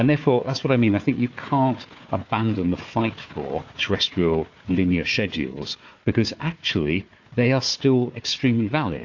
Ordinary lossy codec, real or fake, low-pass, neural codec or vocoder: AAC, 48 kbps; fake; 7.2 kHz; codec, 16 kHz, 4 kbps, FunCodec, trained on Chinese and English, 50 frames a second